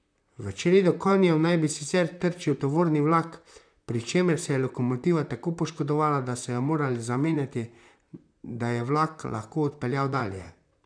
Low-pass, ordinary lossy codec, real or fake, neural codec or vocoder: 9.9 kHz; none; fake; vocoder, 44.1 kHz, 128 mel bands, Pupu-Vocoder